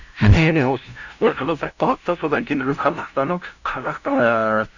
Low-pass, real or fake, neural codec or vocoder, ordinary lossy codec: 7.2 kHz; fake; codec, 16 kHz, 0.5 kbps, FunCodec, trained on LibriTTS, 25 frames a second; none